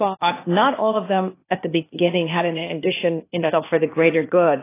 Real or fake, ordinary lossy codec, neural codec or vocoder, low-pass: fake; AAC, 24 kbps; codec, 16 kHz, 0.8 kbps, ZipCodec; 3.6 kHz